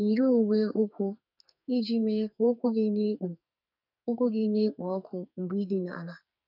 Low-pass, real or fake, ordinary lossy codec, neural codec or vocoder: 5.4 kHz; fake; none; codec, 44.1 kHz, 2.6 kbps, SNAC